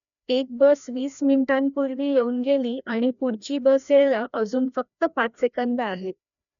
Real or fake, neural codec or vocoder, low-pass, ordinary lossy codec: fake; codec, 16 kHz, 1 kbps, FreqCodec, larger model; 7.2 kHz; none